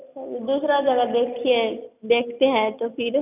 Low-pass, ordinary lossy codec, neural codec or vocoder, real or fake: 3.6 kHz; none; none; real